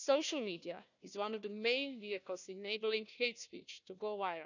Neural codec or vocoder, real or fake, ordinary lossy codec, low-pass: codec, 16 kHz, 1 kbps, FunCodec, trained on Chinese and English, 50 frames a second; fake; none; 7.2 kHz